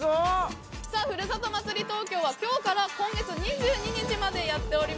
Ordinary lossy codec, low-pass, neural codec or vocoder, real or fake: none; none; none; real